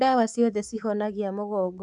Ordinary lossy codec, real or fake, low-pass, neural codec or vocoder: none; fake; none; codec, 24 kHz, 3.1 kbps, DualCodec